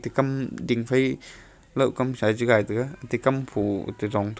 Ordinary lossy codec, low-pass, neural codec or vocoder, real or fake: none; none; none; real